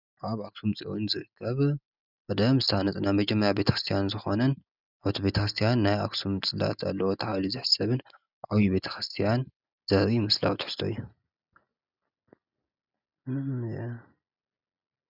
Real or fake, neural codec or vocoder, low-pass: real; none; 5.4 kHz